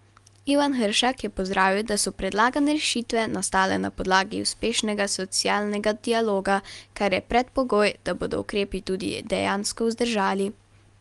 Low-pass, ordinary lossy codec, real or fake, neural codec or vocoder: 10.8 kHz; Opus, 32 kbps; real; none